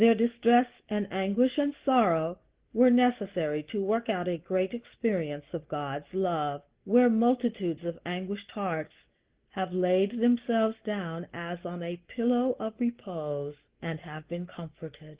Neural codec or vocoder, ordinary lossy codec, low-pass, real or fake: none; Opus, 16 kbps; 3.6 kHz; real